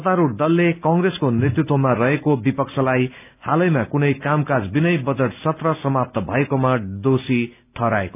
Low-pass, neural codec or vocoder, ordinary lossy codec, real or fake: 3.6 kHz; none; none; real